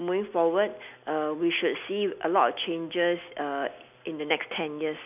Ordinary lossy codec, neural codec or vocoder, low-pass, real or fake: none; none; 3.6 kHz; real